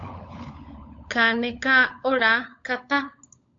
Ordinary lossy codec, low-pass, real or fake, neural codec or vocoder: AAC, 48 kbps; 7.2 kHz; fake; codec, 16 kHz, 16 kbps, FunCodec, trained on LibriTTS, 50 frames a second